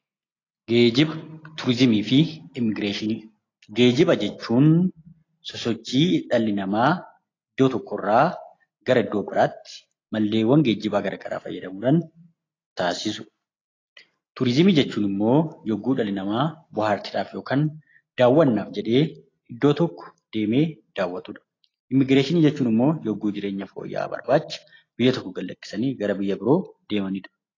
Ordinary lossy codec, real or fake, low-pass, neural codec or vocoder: AAC, 32 kbps; real; 7.2 kHz; none